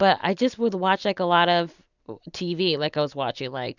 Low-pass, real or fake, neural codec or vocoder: 7.2 kHz; real; none